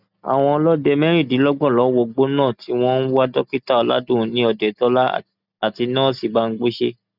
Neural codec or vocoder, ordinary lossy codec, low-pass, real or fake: none; none; 5.4 kHz; real